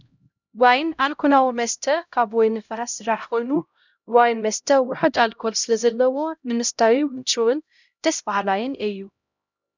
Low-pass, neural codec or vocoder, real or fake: 7.2 kHz; codec, 16 kHz, 0.5 kbps, X-Codec, HuBERT features, trained on LibriSpeech; fake